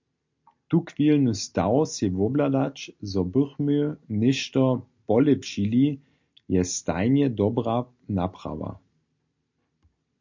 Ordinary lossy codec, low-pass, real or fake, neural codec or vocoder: MP3, 48 kbps; 7.2 kHz; real; none